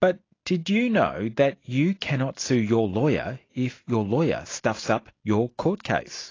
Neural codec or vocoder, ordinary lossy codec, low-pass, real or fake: none; AAC, 32 kbps; 7.2 kHz; real